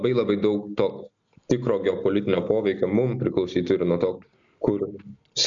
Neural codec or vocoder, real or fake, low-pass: none; real; 7.2 kHz